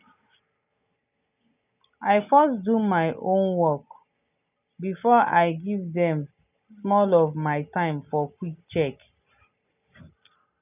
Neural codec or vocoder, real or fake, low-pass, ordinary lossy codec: none; real; 3.6 kHz; none